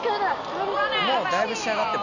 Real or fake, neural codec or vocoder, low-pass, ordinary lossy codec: real; none; 7.2 kHz; none